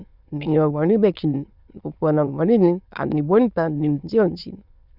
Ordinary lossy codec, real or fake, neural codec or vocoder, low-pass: Opus, 64 kbps; fake; autoencoder, 22.05 kHz, a latent of 192 numbers a frame, VITS, trained on many speakers; 5.4 kHz